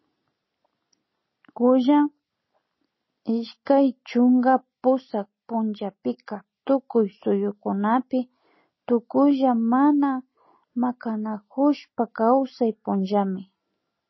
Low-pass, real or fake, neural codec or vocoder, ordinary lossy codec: 7.2 kHz; real; none; MP3, 24 kbps